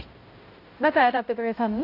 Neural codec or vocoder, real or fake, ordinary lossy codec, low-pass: codec, 16 kHz, 0.5 kbps, X-Codec, HuBERT features, trained on balanced general audio; fake; AAC, 32 kbps; 5.4 kHz